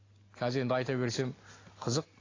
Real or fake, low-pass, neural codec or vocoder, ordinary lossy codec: real; 7.2 kHz; none; AAC, 32 kbps